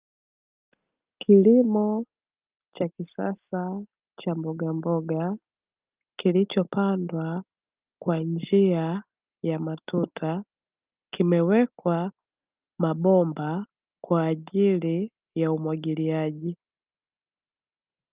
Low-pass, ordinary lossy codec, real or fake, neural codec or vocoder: 3.6 kHz; Opus, 32 kbps; real; none